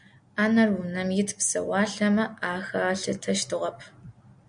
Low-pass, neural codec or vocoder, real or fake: 9.9 kHz; none; real